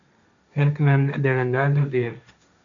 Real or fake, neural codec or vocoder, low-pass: fake; codec, 16 kHz, 1.1 kbps, Voila-Tokenizer; 7.2 kHz